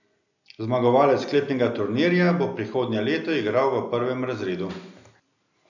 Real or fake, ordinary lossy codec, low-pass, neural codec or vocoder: real; none; 7.2 kHz; none